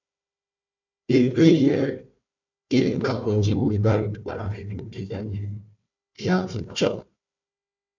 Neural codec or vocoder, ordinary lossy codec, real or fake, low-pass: codec, 16 kHz, 1 kbps, FunCodec, trained on Chinese and English, 50 frames a second; MP3, 64 kbps; fake; 7.2 kHz